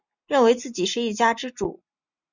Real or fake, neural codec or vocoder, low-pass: real; none; 7.2 kHz